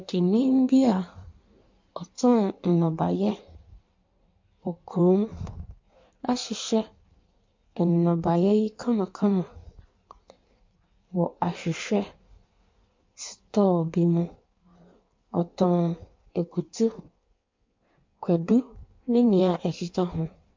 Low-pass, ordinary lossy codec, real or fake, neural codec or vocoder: 7.2 kHz; MP3, 48 kbps; fake; codec, 16 kHz in and 24 kHz out, 1.1 kbps, FireRedTTS-2 codec